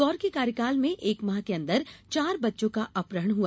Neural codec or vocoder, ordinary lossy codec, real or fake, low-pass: none; none; real; none